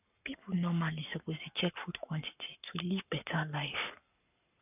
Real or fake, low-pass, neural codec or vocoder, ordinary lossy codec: fake; 3.6 kHz; codec, 44.1 kHz, 7.8 kbps, Pupu-Codec; none